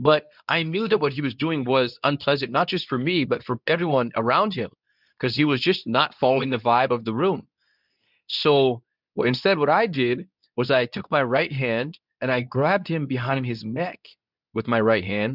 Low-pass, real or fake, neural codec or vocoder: 5.4 kHz; fake; codec, 24 kHz, 0.9 kbps, WavTokenizer, medium speech release version 2